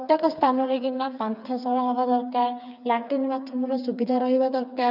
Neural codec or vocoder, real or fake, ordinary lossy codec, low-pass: codec, 16 kHz, 4 kbps, FreqCodec, smaller model; fake; none; 5.4 kHz